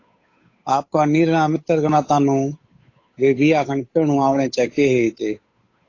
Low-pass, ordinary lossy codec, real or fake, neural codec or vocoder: 7.2 kHz; AAC, 32 kbps; fake; codec, 16 kHz, 8 kbps, FunCodec, trained on Chinese and English, 25 frames a second